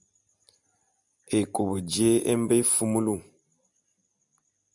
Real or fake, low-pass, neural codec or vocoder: real; 10.8 kHz; none